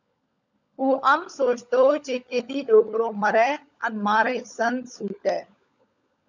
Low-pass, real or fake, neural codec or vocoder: 7.2 kHz; fake; codec, 16 kHz, 16 kbps, FunCodec, trained on LibriTTS, 50 frames a second